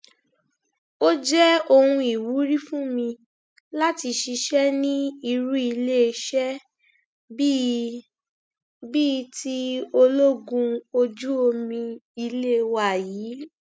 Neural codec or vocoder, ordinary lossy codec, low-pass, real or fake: none; none; none; real